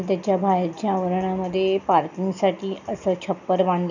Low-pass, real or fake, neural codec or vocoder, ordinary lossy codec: 7.2 kHz; real; none; none